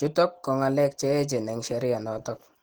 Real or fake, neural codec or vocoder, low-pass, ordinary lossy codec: real; none; 19.8 kHz; Opus, 16 kbps